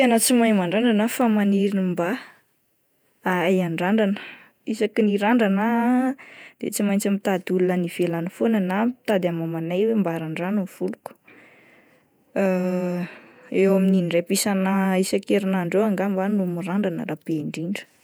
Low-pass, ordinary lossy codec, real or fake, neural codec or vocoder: none; none; fake; vocoder, 48 kHz, 128 mel bands, Vocos